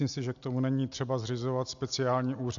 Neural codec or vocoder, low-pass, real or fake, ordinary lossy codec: none; 7.2 kHz; real; AAC, 64 kbps